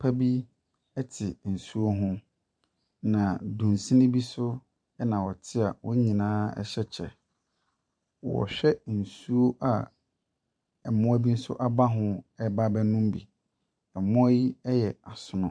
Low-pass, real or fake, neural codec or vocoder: 9.9 kHz; fake; vocoder, 44.1 kHz, 128 mel bands every 512 samples, BigVGAN v2